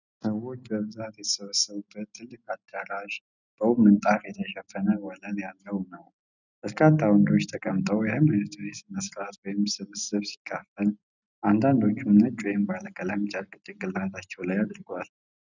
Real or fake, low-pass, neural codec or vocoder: real; 7.2 kHz; none